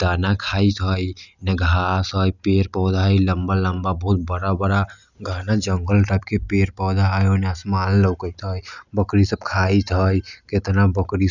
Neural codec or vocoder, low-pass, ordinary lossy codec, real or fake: none; 7.2 kHz; none; real